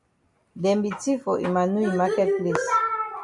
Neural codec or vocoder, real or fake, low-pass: none; real; 10.8 kHz